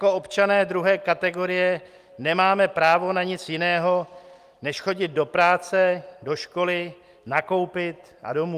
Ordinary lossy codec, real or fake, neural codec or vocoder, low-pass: Opus, 32 kbps; real; none; 14.4 kHz